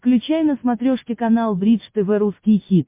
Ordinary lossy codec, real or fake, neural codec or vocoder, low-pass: MP3, 24 kbps; real; none; 3.6 kHz